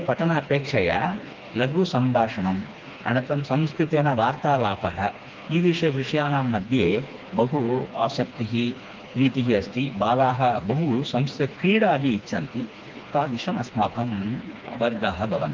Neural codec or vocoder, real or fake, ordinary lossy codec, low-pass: codec, 16 kHz, 2 kbps, FreqCodec, smaller model; fake; Opus, 24 kbps; 7.2 kHz